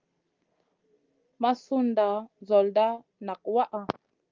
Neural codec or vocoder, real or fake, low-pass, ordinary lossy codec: none; real; 7.2 kHz; Opus, 32 kbps